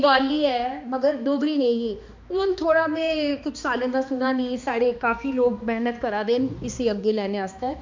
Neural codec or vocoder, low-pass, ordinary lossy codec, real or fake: codec, 16 kHz, 2 kbps, X-Codec, HuBERT features, trained on balanced general audio; 7.2 kHz; MP3, 64 kbps; fake